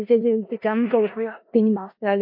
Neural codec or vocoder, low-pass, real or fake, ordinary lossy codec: codec, 16 kHz in and 24 kHz out, 0.4 kbps, LongCat-Audio-Codec, four codebook decoder; 5.4 kHz; fake; MP3, 32 kbps